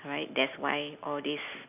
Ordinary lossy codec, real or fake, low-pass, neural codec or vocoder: none; real; 3.6 kHz; none